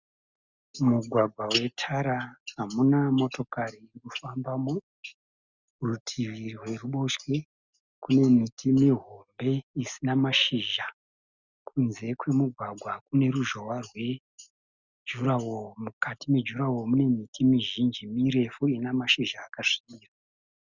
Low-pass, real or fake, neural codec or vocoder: 7.2 kHz; real; none